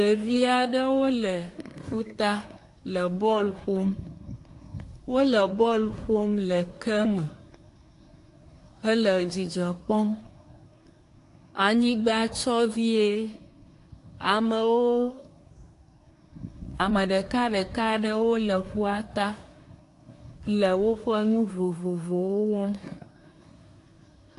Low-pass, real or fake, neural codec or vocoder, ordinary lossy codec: 10.8 kHz; fake; codec, 24 kHz, 1 kbps, SNAC; AAC, 48 kbps